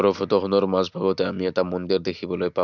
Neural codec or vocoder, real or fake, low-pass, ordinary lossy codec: none; real; 7.2 kHz; none